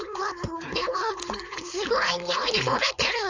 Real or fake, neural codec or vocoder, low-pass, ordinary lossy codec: fake; codec, 16 kHz, 4.8 kbps, FACodec; 7.2 kHz; AAC, 48 kbps